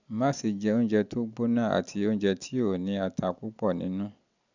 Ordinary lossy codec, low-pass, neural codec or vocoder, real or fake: none; 7.2 kHz; none; real